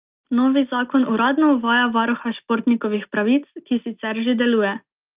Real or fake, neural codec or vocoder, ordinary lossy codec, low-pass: real; none; Opus, 24 kbps; 3.6 kHz